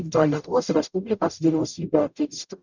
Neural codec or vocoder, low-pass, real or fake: codec, 44.1 kHz, 0.9 kbps, DAC; 7.2 kHz; fake